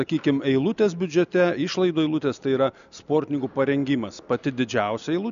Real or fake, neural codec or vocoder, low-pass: real; none; 7.2 kHz